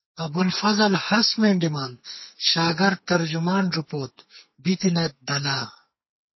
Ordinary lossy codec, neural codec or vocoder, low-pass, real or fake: MP3, 24 kbps; codec, 44.1 kHz, 2.6 kbps, SNAC; 7.2 kHz; fake